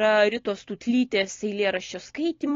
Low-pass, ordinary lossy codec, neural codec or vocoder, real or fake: 7.2 kHz; AAC, 32 kbps; none; real